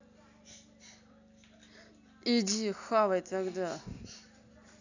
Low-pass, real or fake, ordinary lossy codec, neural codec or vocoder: 7.2 kHz; real; none; none